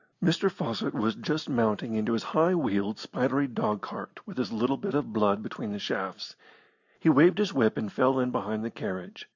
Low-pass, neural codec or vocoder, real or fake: 7.2 kHz; none; real